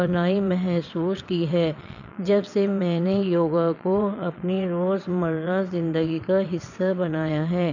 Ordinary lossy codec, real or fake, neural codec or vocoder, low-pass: none; fake; vocoder, 22.05 kHz, 80 mel bands, WaveNeXt; 7.2 kHz